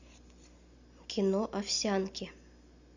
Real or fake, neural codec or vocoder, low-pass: real; none; 7.2 kHz